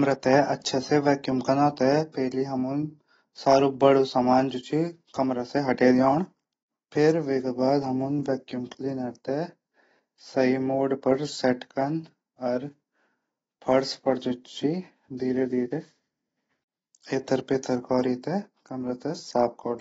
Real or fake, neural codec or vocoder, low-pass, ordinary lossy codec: real; none; 14.4 kHz; AAC, 24 kbps